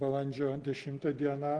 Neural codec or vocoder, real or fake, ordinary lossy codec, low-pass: none; real; Opus, 16 kbps; 9.9 kHz